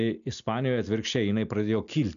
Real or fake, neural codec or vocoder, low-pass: real; none; 7.2 kHz